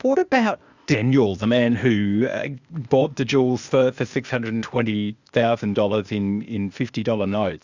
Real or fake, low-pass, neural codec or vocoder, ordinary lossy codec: fake; 7.2 kHz; codec, 16 kHz, 0.8 kbps, ZipCodec; Opus, 64 kbps